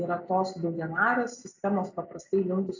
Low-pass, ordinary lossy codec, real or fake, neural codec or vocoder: 7.2 kHz; AAC, 48 kbps; real; none